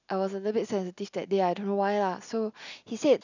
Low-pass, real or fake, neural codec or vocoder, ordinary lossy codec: 7.2 kHz; real; none; none